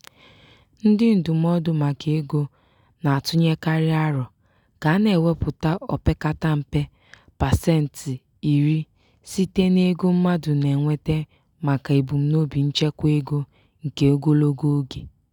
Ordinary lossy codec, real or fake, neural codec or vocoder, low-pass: none; real; none; 19.8 kHz